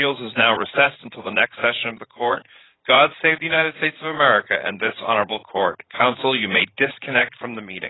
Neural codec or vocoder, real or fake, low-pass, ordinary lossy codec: vocoder, 44.1 kHz, 80 mel bands, Vocos; fake; 7.2 kHz; AAC, 16 kbps